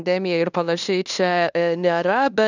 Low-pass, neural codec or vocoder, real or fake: 7.2 kHz; codec, 16 kHz in and 24 kHz out, 0.9 kbps, LongCat-Audio-Codec, fine tuned four codebook decoder; fake